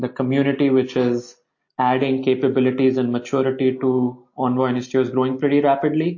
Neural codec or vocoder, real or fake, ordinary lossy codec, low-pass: none; real; MP3, 32 kbps; 7.2 kHz